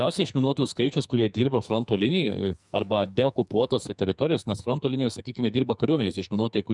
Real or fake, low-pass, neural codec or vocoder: fake; 10.8 kHz; codec, 44.1 kHz, 2.6 kbps, SNAC